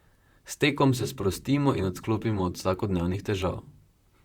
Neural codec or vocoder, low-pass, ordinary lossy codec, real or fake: vocoder, 44.1 kHz, 128 mel bands, Pupu-Vocoder; 19.8 kHz; Opus, 64 kbps; fake